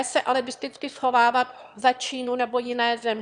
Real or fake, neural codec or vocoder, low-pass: fake; autoencoder, 22.05 kHz, a latent of 192 numbers a frame, VITS, trained on one speaker; 9.9 kHz